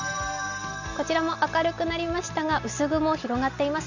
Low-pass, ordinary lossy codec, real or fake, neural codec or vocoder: 7.2 kHz; none; real; none